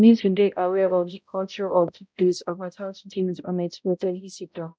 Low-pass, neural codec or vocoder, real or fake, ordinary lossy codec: none; codec, 16 kHz, 0.5 kbps, X-Codec, HuBERT features, trained on balanced general audio; fake; none